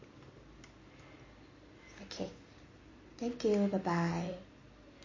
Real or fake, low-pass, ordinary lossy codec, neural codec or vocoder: real; 7.2 kHz; MP3, 32 kbps; none